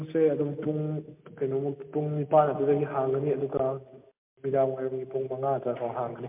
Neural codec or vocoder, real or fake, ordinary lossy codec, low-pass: none; real; MP3, 32 kbps; 3.6 kHz